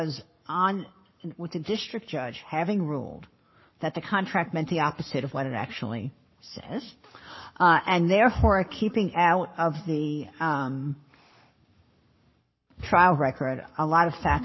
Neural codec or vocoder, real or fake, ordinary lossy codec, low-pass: codec, 44.1 kHz, 7.8 kbps, Pupu-Codec; fake; MP3, 24 kbps; 7.2 kHz